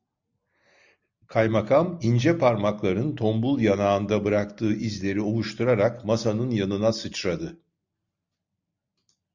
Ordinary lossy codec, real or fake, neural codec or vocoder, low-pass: Opus, 64 kbps; real; none; 7.2 kHz